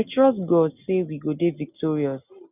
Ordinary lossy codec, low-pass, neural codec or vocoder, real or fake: none; 3.6 kHz; none; real